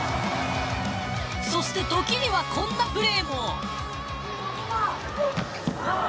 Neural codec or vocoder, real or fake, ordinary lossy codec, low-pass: none; real; none; none